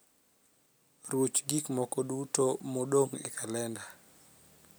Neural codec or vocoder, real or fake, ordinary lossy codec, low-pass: none; real; none; none